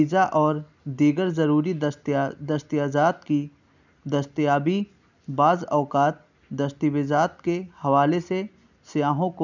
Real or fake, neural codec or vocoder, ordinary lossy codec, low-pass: real; none; none; 7.2 kHz